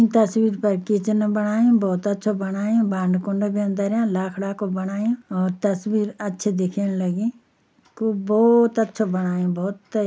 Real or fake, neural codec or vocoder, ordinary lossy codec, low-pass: real; none; none; none